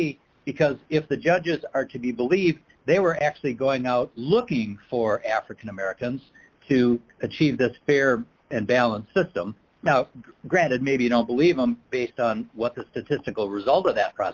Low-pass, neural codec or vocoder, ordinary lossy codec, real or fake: 7.2 kHz; none; Opus, 24 kbps; real